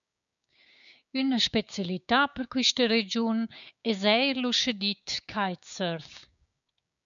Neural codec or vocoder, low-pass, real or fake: codec, 16 kHz, 6 kbps, DAC; 7.2 kHz; fake